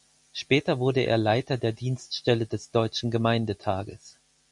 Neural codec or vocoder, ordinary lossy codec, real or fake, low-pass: none; MP3, 96 kbps; real; 10.8 kHz